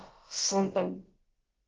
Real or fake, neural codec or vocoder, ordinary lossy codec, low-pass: fake; codec, 16 kHz, about 1 kbps, DyCAST, with the encoder's durations; Opus, 16 kbps; 7.2 kHz